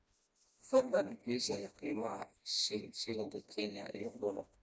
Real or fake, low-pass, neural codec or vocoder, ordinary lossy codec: fake; none; codec, 16 kHz, 1 kbps, FreqCodec, smaller model; none